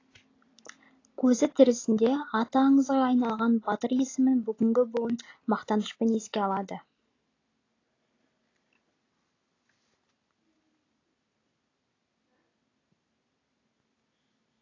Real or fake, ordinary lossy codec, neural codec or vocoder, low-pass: real; AAC, 32 kbps; none; 7.2 kHz